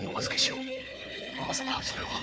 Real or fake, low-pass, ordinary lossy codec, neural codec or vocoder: fake; none; none; codec, 16 kHz, 4 kbps, FunCodec, trained on Chinese and English, 50 frames a second